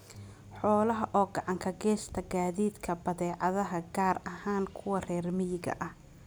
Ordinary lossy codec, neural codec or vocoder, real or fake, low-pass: none; none; real; none